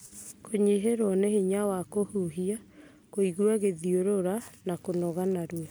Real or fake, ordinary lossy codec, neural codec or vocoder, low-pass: real; none; none; none